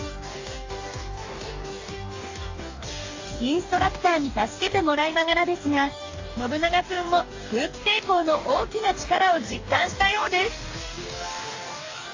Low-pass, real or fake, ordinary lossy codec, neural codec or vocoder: 7.2 kHz; fake; AAC, 48 kbps; codec, 44.1 kHz, 2.6 kbps, DAC